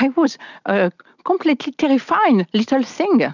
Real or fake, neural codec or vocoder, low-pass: real; none; 7.2 kHz